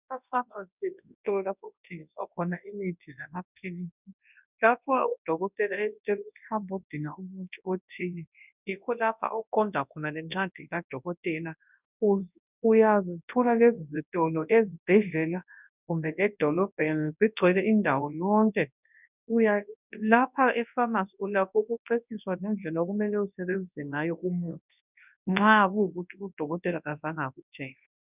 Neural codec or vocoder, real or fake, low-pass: codec, 24 kHz, 0.9 kbps, WavTokenizer, large speech release; fake; 3.6 kHz